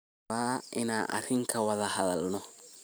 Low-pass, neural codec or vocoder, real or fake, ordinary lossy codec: none; vocoder, 44.1 kHz, 128 mel bands every 512 samples, BigVGAN v2; fake; none